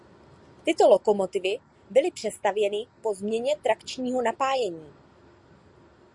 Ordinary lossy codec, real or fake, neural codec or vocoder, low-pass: Opus, 64 kbps; real; none; 10.8 kHz